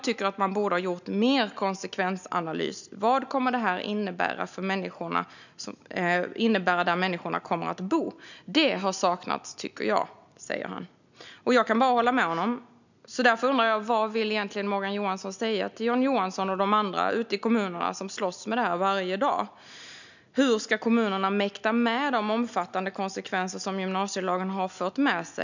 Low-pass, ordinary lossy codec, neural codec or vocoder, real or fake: 7.2 kHz; none; none; real